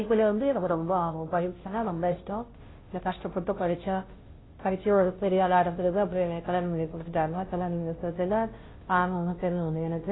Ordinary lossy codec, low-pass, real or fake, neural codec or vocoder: AAC, 16 kbps; 7.2 kHz; fake; codec, 16 kHz, 0.5 kbps, FunCodec, trained on Chinese and English, 25 frames a second